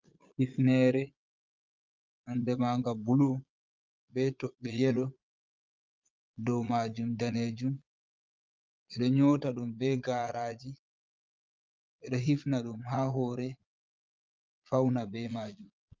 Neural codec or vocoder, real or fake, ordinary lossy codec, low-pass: vocoder, 22.05 kHz, 80 mel bands, Vocos; fake; Opus, 24 kbps; 7.2 kHz